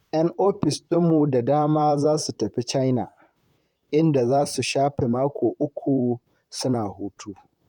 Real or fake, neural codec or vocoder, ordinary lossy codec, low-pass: fake; vocoder, 44.1 kHz, 128 mel bands, Pupu-Vocoder; none; 19.8 kHz